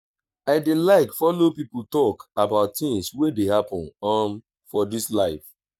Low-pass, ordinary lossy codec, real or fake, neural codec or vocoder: 19.8 kHz; none; fake; codec, 44.1 kHz, 7.8 kbps, Pupu-Codec